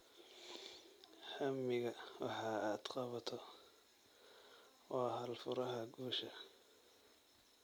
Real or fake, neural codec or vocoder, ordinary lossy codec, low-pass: real; none; none; none